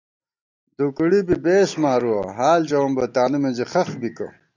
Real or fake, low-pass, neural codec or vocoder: real; 7.2 kHz; none